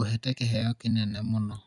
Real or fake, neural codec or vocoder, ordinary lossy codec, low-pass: fake; vocoder, 44.1 kHz, 128 mel bands every 256 samples, BigVGAN v2; none; 10.8 kHz